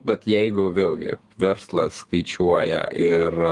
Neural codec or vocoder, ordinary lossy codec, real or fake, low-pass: codec, 32 kHz, 1.9 kbps, SNAC; Opus, 24 kbps; fake; 10.8 kHz